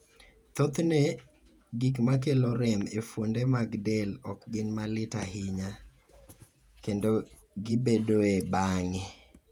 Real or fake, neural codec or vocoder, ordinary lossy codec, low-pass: fake; vocoder, 48 kHz, 128 mel bands, Vocos; none; 19.8 kHz